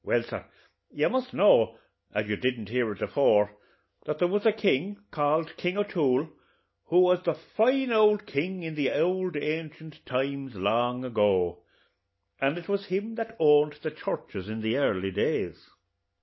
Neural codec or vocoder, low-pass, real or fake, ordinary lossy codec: none; 7.2 kHz; real; MP3, 24 kbps